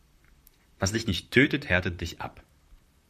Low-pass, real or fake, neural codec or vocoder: 14.4 kHz; fake; vocoder, 44.1 kHz, 128 mel bands, Pupu-Vocoder